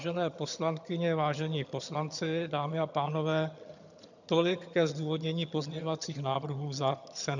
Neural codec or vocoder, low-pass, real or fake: vocoder, 22.05 kHz, 80 mel bands, HiFi-GAN; 7.2 kHz; fake